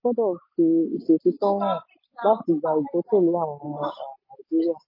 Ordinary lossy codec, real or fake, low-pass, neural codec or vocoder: MP3, 24 kbps; real; 5.4 kHz; none